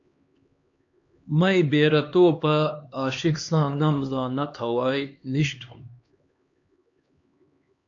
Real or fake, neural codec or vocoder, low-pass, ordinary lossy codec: fake; codec, 16 kHz, 2 kbps, X-Codec, HuBERT features, trained on LibriSpeech; 7.2 kHz; AAC, 48 kbps